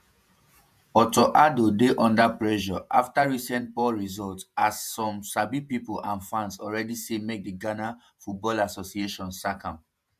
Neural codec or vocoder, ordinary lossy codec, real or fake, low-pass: vocoder, 48 kHz, 128 mel bands, Vocos; MP3, 96 kbps; fake; 14.4 kHz